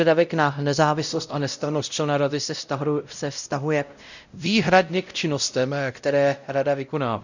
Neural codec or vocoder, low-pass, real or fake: codec, 16 kHz, 0.5 kbps, X-Codec, WavLM features, trained on Multilingual LibriSpeech; 7.2 kHz; fake